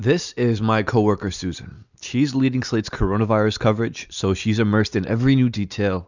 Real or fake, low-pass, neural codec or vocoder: real; 7.2 kHz; none